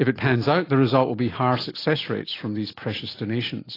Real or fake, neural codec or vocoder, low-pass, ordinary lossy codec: real; none; 5.4 kHz; AAC, 24 kbps